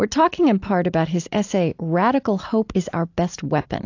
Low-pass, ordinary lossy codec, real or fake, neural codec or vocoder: 7.2 kHz; AAC, 48 kbps; real; none